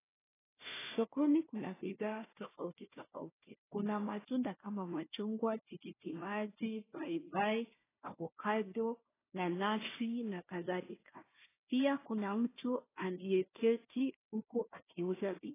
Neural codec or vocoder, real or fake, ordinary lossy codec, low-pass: codec, 16 kHz, 1 kbps, FunCodec, trained on Chinese and English, 50 frames a second; fake; AAC, 16 kbps; 3.6 kHz